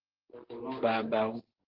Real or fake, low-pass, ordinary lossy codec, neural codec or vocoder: real; 5.4 kHz; Opus, 16 kbps; none